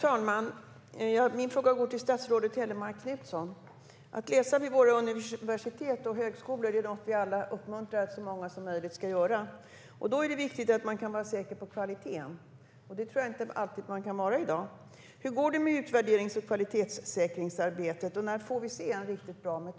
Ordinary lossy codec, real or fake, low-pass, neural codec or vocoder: none; real; none; none